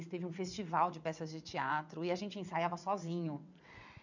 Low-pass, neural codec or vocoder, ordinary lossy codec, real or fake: 7.2 kHz; vocoder, 22.05 kHz, 80 mel bands, WaveNeXt; none; fake